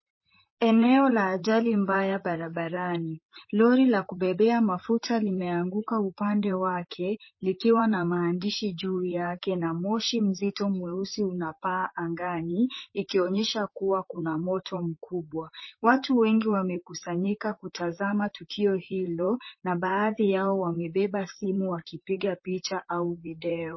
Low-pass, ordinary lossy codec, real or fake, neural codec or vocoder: 7.2 kHz; MP3, 24 kbps; fake; vocoder, 44.1 kHz, 128 mel bands, Pupu-Vocoder